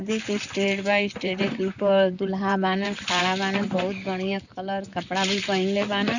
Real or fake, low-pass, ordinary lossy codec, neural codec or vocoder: fake; 7.2 kHz; none; vocoder, 44.1 kHz, 128 mel bands, Pupu-Vocoder